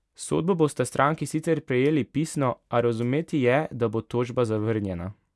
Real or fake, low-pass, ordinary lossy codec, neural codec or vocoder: real; none; none; none